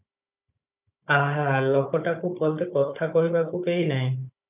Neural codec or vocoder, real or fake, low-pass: codec, 16 kHz, 16 kbps, FunCodec, trained on Chinese and English, 50 frames a second; fake; 3.6 kHz